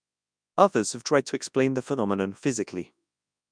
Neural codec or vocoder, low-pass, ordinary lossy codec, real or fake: codec, 24 kHz, 0.9 kbps, WavTokenizer, large speech release; 9.9 kHz; none; fake